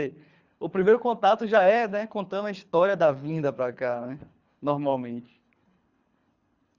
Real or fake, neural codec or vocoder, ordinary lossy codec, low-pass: fake; codec, 24 kHz, 3 kbps, HILCodec; Opus, 64 kbps; 7.2 kHz